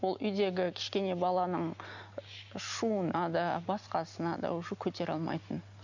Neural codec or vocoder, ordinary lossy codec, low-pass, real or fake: none; none; 7.2 kHz; real